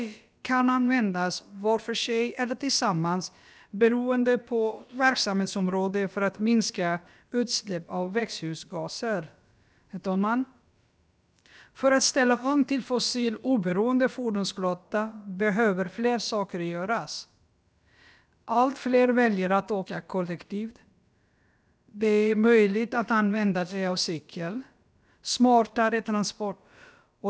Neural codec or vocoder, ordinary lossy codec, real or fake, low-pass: codec, 16 kHz, about 1 kbps, DyCAST, with the encoder's durations; none; fake; none